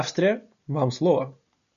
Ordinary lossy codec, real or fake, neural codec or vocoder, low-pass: AAC, 96 kbps; real; none; 7.2 kHz